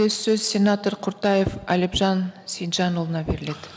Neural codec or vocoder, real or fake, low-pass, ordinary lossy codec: none; real; none; none